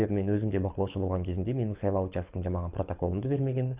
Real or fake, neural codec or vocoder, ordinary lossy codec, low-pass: fake; codec, 24 kHz, 6 kbps, HILCodec; Opus, 64 kbps; 3.6 kHz